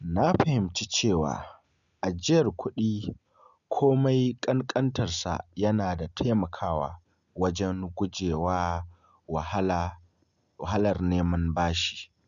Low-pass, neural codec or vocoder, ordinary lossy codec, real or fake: 7.2 kHz; none; none; real